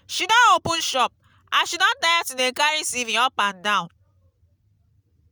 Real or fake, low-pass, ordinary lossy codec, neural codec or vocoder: real; none; none; none